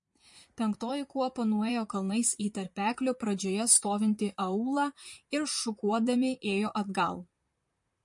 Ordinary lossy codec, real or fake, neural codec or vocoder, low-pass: MP3, 48 kbps; fake; vocoder, 44.1 kHz, 128 mel bands, Pupu-Vocoder; 10.8 kHz